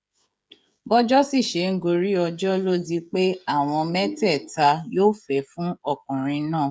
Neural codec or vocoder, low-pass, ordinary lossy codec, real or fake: codec, 16 kHz, 16 kbps, FreqCodec, smaller model; none; none; fake